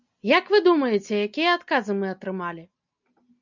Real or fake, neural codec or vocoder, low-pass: real; none; 7.2 kHz